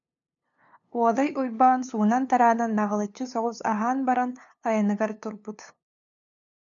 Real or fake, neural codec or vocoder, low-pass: fake; codec, 16 kHz, 2 kbps, FunCodec, trained on LibriTTS, 25 frames a second; 7.2 kHz